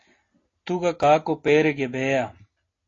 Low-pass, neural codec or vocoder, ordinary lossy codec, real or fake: 7.2 kHz; none; AAC, 32 kbps; real